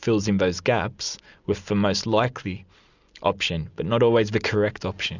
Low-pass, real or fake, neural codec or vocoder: 7.2 kHz; real; none